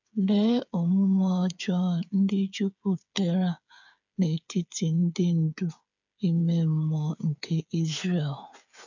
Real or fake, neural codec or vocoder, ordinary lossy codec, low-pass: fake; codec, 16 kHz, 8 kbps, FreqCodec, smaller model; none; 7.2 kHz